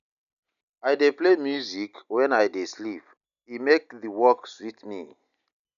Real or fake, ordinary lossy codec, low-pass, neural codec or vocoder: real; none; 7.2 kHz; none